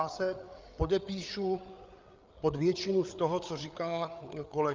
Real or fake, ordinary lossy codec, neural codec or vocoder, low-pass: fake; Opus, 24 kbps; codec, 16 kHz, 8 kbps, FreqCodec, larger model; 7.2 kHz